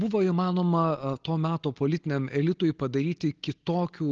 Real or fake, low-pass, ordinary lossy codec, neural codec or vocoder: real; 7.2 kHz; Opus, 16 kbps; none